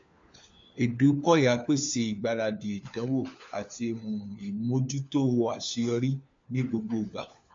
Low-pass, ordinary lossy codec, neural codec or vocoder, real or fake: 7.2 kHz; MP3, 48 kbps; codec, 16 kHz, 4 kbps, FunCodec, trained on LibriTTS, 50 frames a second; fake